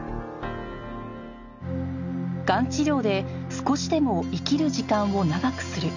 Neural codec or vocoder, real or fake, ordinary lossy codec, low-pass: none; real; none; 7.2 kHz